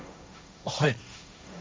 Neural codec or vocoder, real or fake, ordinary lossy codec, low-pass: codec, 16 kHz, 1.1 kbps, Voila-Tokenizer; fake; none; none